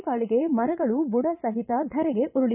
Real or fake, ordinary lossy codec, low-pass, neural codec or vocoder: fake; none; 3.6 kHz; vocoder, 22.05 kHz, 80 mel bands, Vocos